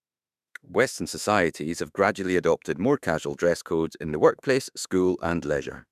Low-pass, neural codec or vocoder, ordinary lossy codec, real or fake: 14.4 kHz; autoencoder, 48 kHz, 32 numbers a frame, DAC-VAE, trained on Japanese speech; none; fake